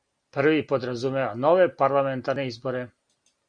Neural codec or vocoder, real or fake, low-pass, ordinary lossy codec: none; real; 9.9 kHz; Opus, 64 kbps